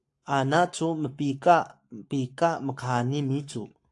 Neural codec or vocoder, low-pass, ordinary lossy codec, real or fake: codec, 44.1 kHz, 7.8 kbps, Pupu-Codec; 10.8 kHz; AAC, 64 kbps; fake